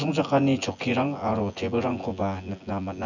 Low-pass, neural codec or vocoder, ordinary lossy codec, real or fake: 7.2 kHz; vocoder, 24 kHz, 100 mel bands, Vocos; none; fake